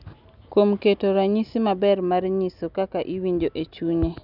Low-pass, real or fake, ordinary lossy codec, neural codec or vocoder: 5.4 kHz; real; none; none